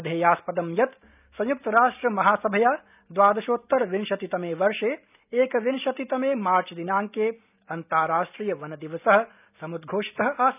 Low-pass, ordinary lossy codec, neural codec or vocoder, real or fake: 3.6 kHz; none; none; real